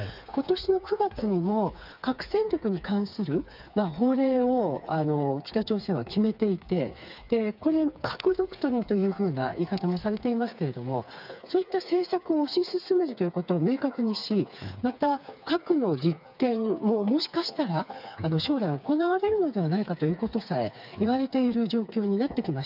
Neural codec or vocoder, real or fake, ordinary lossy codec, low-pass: codec, 16 kHz, 4 kbps, FreqCodec, smaller model; fake; none; 5.4 kHz